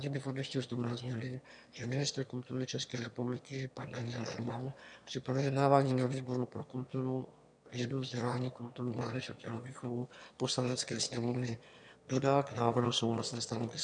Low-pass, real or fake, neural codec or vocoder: 9.9 kHz; fake; autoencoder, 22.05 kHz, a latent of 192 numbers a frame, VITS, trained on one speaker